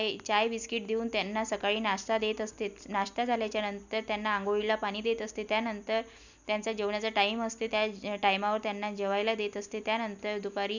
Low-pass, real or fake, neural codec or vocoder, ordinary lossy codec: 7.2 kHz; real; none; none